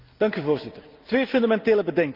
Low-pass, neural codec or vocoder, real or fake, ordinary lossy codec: 5.4 kHz; none; real; Opus, 24 kbps